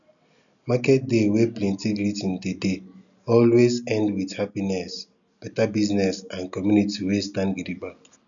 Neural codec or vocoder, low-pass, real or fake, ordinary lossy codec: none; 7.2 kHz; real; none